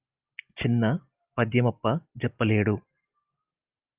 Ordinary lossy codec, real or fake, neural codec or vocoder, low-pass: Opus, 24 kbps; real; none; 3.6 kHz